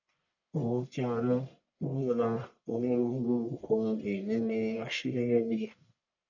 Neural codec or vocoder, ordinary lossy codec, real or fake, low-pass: codec, 44.1 kHz, 1.7 kbps, Pupu-Codec; none; fake; 7.2 kHz